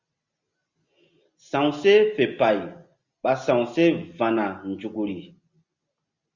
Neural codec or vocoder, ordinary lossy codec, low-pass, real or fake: none; Opus, 64 kbps; 7.2 kHz; real